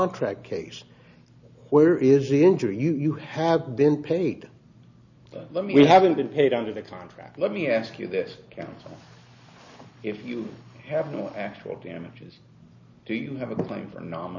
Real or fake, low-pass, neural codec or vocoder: real; 7.2 kHz; none